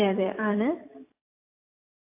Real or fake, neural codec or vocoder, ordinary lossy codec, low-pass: fake; vocoder, 44.1 kHz, 128 mel bands every 256 samples, BigVGAN v2; AAC, 24 kbps; 3.6 kHz